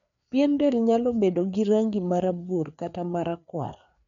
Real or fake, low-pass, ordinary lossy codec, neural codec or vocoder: fake; 7.2 kHz; none; codec, 16 kHz, 4 kbps, FreqCodec, larger model